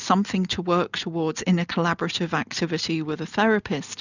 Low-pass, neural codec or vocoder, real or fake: 7.2 kHz; none; real